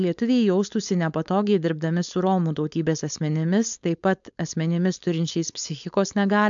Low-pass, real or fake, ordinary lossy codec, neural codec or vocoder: 7.2 kHz; fake; MP3, 64 kbps; codec, 16 kHz, 4.8 kbps, FACodec